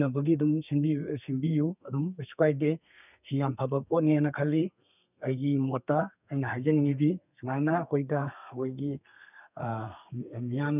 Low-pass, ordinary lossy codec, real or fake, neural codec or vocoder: 3.6 kHz; none; fake; codec, 32 kHz, 1.9 kbps, SNAC